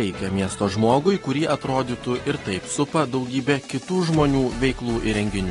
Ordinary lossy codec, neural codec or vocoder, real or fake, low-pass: AAC, 32 kbps; none; real; 10.8 kHz